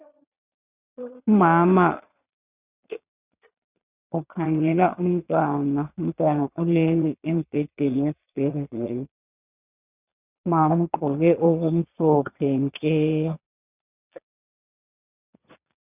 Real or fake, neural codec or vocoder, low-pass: fake; vocoder, 22.05 kHz, 80 mel bands, Vocos; 3.6 kHz